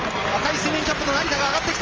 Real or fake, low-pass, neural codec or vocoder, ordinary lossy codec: real; 7.2 kHz; none; Opus, 24 kbps